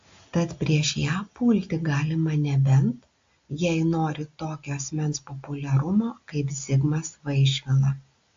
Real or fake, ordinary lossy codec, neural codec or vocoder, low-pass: real; AAC, 48 kbps; none; 7.2 kHz